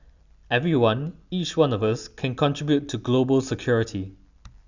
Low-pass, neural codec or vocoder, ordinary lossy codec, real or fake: 7.2 kHz; none; none; real